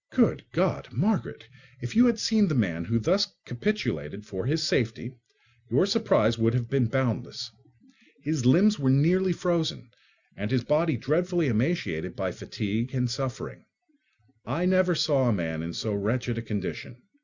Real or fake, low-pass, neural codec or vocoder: real; 7.2 kHz; none